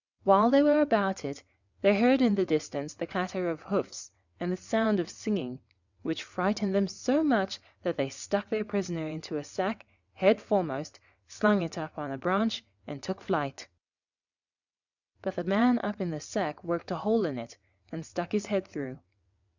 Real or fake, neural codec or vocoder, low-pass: fake; vocoder, 22.05 kHz, 80 mel bands, WaveNeXt; 7.2 kHz